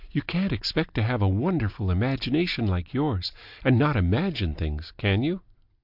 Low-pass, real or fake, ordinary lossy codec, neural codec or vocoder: 5.4 kHz; real; AAC, 48 kbps; none